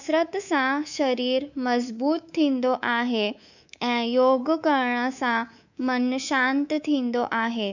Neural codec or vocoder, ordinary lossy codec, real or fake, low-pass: codec, 24 kHz, 3.1 kbps, DualCodec; none; fake; 7.2 kHz